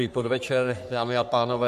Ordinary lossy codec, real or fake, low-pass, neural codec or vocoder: MP3, 96 kbps; fake; 14.4 kHz; codec, 44.1 kHz, 3.4 kbps, Pupu-Codec